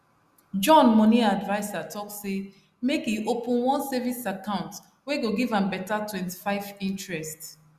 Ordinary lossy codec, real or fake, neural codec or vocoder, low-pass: Opus, 64 kbps; real; none; 14.4 kHz